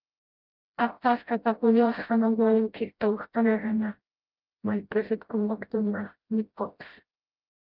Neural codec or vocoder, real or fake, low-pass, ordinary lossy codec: codec, 16 kHz, 0.5 kbps, FreqCodec, smaller model; fake; 5.4 kHz; Opus, 24 kbps